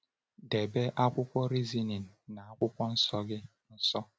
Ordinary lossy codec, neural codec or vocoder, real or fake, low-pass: none; none; real; none